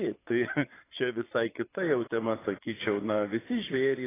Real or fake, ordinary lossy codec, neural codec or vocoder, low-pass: fake; AAC, 16 kbps; vocoder, 22.05 kHz, 80 mel bands, Vocos; 3.6 kHz